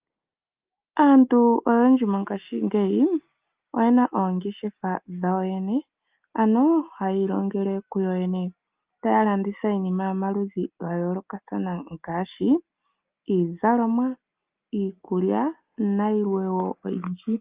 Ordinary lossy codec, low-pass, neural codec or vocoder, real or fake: Opus, 32 kbps; 3.6 kHz; none; real